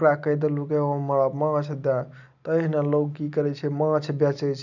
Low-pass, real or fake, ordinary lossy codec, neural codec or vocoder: 7.2 kHz; real; none; none